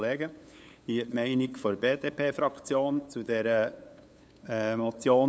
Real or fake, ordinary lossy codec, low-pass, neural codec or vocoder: fake; none; none; codec, 16 kHz, 8 kbps, FunCodec, trained on LibriTTS, 25 frames a second